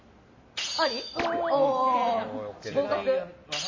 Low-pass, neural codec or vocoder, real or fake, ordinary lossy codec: 7.2 kHz; none; real; MP3, 32 kbps